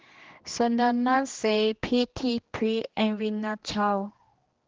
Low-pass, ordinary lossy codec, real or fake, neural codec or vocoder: 7.2 kHz; Opus, 16 kbps; fake; codec, 16 kHz, 2 kbps, X-Codec, HuBERT features, trained on general audio